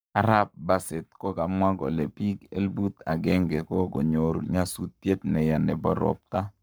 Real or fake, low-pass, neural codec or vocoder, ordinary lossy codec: fake; none; codec, 44.1 kHz, 7.8 kbps, Pupu-Codec; none